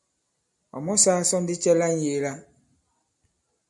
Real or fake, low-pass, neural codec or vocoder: real; 10.8 kHz; none